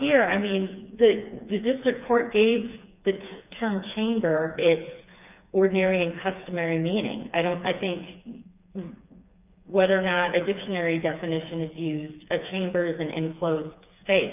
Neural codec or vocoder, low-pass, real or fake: codec, 16 kHz, 4 kbps, FreqCodec, smaller model; 3.6 kHz; fake